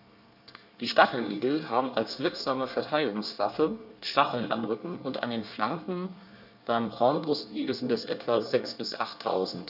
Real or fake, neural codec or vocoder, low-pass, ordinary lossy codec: fake; codec, 24 kHz, 1 kbps, SNAC; 5.4 kHz; none